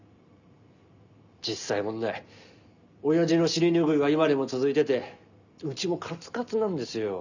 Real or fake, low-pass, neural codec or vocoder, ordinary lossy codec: real; 7.2 kHz; none; none